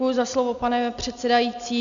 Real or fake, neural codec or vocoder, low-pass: real; none; 7.2 kHz